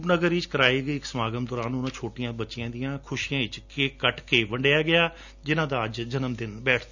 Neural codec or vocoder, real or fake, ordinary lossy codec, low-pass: none; real; none; 7.2 kHz